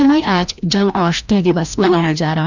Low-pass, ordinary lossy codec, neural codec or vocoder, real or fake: 7.2 kHz; none; codec, 16 kHz, 1 kbps, FreqCodec, larger model; fake